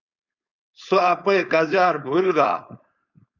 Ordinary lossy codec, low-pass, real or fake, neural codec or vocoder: Opus, 64 kbps; 7.2 kHz; fake; codec, 16 kHz, 4.8 kbps, FACodec